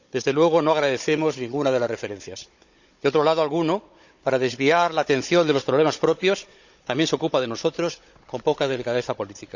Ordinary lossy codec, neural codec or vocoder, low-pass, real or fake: none; codec, 16 kHz, 16 kbps, FunCodec, trained on Chinese and English, 50 frames a second; 7.2 kHz; fake